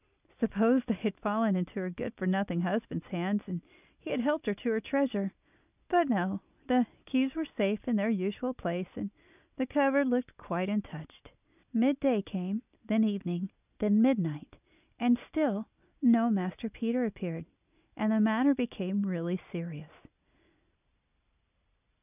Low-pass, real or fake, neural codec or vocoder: 3.6 kHz; real; none